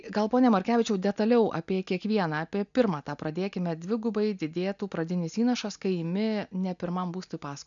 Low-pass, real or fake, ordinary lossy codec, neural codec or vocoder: 7.2 kHz; real; MP3, 96 kbps; none